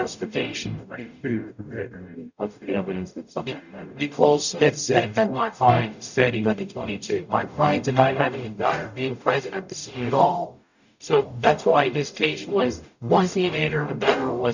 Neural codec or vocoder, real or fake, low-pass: codec, 44.1 kHz, 0.9 kbps, DAC; fake; 7.2 kHz